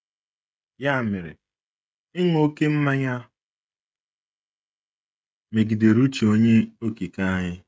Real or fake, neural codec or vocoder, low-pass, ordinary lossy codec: fake; codec, 16 kHz, 16 kbps, FreqCodec, smaller model; none; none